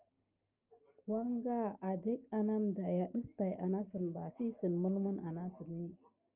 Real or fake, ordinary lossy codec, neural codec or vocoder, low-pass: real; Opus, 32 kbps; none; 3.6 kHz